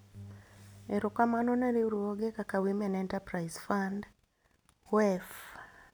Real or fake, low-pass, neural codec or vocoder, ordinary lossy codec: real; none; none; none